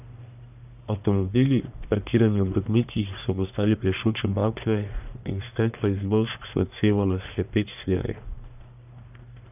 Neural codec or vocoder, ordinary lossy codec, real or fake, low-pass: codec, 44.1 kHz, 1.7 kbps, Pupu-Codec; none; fake; 3.6 kHz